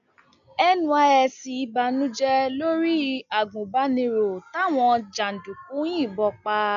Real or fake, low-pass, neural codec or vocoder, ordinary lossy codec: real; 7.2 kHz; none; none